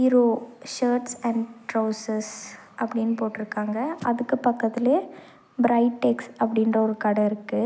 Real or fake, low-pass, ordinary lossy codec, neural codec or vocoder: real; none; none; none